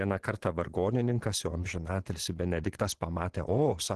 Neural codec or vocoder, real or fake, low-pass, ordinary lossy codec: vocoder, 24 kHz, 100 mel bands, Vocos; fake; 10.8 kHz; Opus, 16 kbps